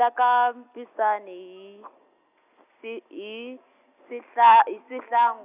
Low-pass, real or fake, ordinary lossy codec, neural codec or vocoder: 3.6 kHz; real; none; none